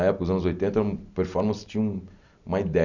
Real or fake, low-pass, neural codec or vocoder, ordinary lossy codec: real; 7.2 kHz; none; none